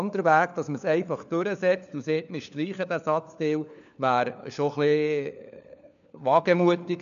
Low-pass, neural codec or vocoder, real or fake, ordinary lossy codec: 7.2 kHz; codec, 16 kHz, 4 kbps, FunCodec, trained on LibriTTS, 50 frames a second; fake; none